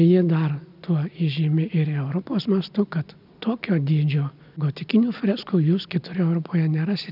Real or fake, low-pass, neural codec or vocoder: real; 5.4 kHz; none